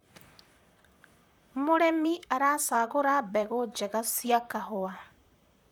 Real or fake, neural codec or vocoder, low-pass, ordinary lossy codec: fake; vocoder, 44.1 kHz, 128 mel bands, Pupu-Vocoder; none; none